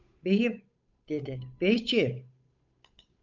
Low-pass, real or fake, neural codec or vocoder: 7.2 kHz; fake; codec, 16 kHz, 8 kbps, FunCodec, trained on Chinese and English, 25 frames a second